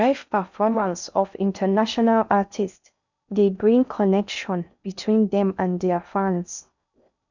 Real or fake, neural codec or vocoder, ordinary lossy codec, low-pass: fake; codec, 16 kHz in and 24 kHz out, 0.6 kbps, FocalCodec, streaming, 2048 codes; none; 7.2 kHz